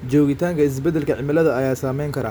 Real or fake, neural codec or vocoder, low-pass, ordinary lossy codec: real; none; none; none